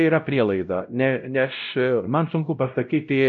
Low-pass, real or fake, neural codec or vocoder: 7.2 kHz; fake; codec, 16 kHz, 0.5 kbps, X-Codec, WavLM features, trained on Multilingual LibriSpeech